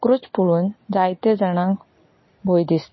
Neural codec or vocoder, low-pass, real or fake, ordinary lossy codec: none; 7.2 kHz; real; MP3, 24 kbps